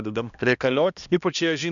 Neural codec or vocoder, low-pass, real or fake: codec, 16 kHz, 1 kbps, X-Codec, HuBERT features, trained on balanced general audio; 7.2 kHz; fake